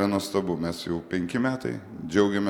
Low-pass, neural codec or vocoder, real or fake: 19.8 kHz; none; real